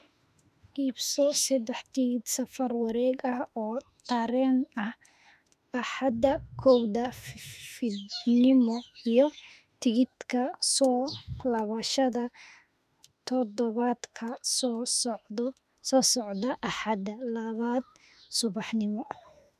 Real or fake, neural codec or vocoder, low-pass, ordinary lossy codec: fake; autoencoder, 48 kHz, 32 numbers a frame, DAC-VAE, trained on Japanese speech; 14.4 kHz; none